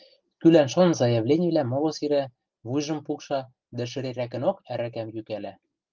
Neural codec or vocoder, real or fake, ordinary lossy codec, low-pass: none; real; Opus, 32 kbps; 7.2 kHz